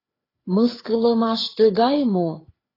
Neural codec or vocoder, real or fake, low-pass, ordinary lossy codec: codec, 16 kHz, 4 kbps, FreqCodec, larger model; fake; 5.4 kHz; AAC, 24 kbps